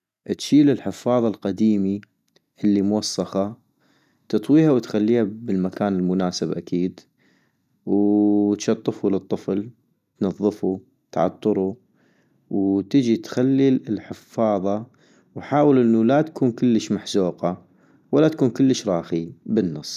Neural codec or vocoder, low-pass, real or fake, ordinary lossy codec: none; 14.4 kHz; real; none